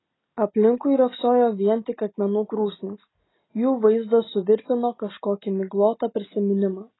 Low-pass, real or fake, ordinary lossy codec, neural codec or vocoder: 7.2 kHz; real; AAC, 16 kbps; none